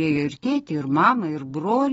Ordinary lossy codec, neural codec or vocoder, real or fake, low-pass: AAC, 24 kbps; none; real; 19.8 kHz